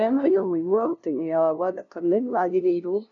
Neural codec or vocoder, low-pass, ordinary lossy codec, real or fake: codec, 16 kHz, 0.5 kbps, FunCodec, trained on LibriTTS, 25 frames a second; 7.2 kHz; none; fake